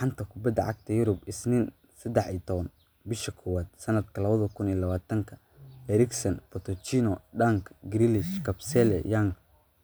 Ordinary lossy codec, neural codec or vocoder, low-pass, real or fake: none; none; none; real